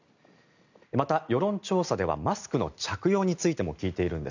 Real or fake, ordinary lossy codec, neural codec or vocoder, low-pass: real; none; none; 7.2 kHz